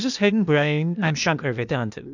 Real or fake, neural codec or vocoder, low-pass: fake; codec, 16 kHz, 0.8 kbps, ZipCodec; 7.2 kHz